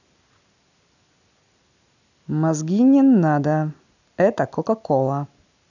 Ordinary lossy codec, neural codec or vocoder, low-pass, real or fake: none; none; 7.2 kHz; real